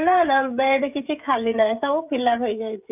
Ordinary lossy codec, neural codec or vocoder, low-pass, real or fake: none; codec, 16 kHz, 16 kbps, FreqCodec, smaller model; 3.6 kHz; fake